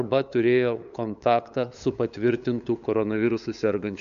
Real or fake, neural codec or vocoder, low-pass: fake; codec, 16 kHz, 8 kbps, FunCodec, trained on LibriTTS, 25 frames a second; 7.2 kHz